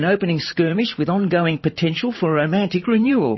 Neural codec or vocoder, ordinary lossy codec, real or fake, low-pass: vocoder, 44.1 kHz, 128 mel bands every 512 samples, BigVGAN v2; MP3, 24 kbps; fake; 7.2 kHz